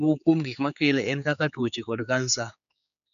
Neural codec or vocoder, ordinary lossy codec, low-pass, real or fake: codec, 16 kHz, 4 kbps, X-Codec, HuBERT features, trained on general audio; none; 7.2 kHz; fake